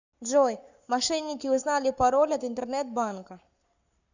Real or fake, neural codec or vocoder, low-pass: fake; codec, 44.1 kHz, 7.8 kbps, Pupu-Codec; 7.2 kHz